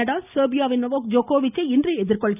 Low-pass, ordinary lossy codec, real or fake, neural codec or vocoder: 3.6 kHz; none; real; none